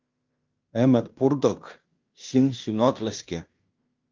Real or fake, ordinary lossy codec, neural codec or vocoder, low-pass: fake; Opus, 24 kbps; codec, 16 kHz in and 24 kHz out, 0.9 kbps, LongCat-Audio-Codec, fine tuned four codebook decoder; 7.2 kHz